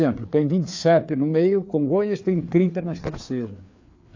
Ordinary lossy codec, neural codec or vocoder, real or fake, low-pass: AAC, 48 kbps; codec, 16 kHz, 4 kbps, FreqCodec, larger model; fake; 7.2 kHz